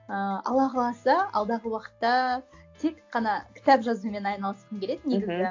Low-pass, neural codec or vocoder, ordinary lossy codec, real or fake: 7.2 kHz; none; AAC, 48 kbps; real